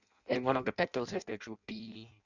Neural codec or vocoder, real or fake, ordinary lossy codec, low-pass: codec, 16 kHz in and 24 kHz out, 0.6 kbps, FireRedTTS-2 codec; fake; none; 7.2 kHz